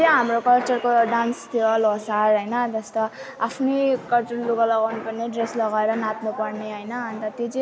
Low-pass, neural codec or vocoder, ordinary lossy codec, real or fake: none; none; none; real